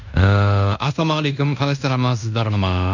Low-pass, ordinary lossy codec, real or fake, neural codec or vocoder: 7.2 kHz; none; fake; codec, 16 kHz in and 24 kHz out, 0.9 kbps, LongCat-Audio-Codec, fine tuned four codebook decoder